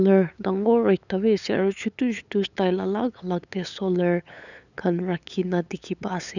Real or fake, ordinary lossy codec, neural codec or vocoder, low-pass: fake; none; codec, 16 kHz, 8 kbps, FunCodec, trained on LibriTTS, 25 frames a second; 7.2 kHz